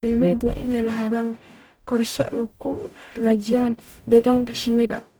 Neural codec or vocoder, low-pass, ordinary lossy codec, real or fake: codec, 44.1 kHz, 0.9 kbps, DAC; none; none; fake